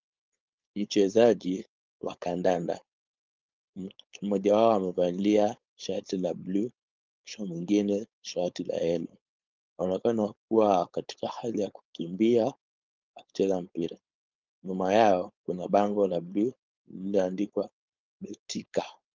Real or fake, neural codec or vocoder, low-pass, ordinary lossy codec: fake; codec, 16 kHz, 4.8 kbps, FACodec; 7.2 kHz; Opus, 24 kbps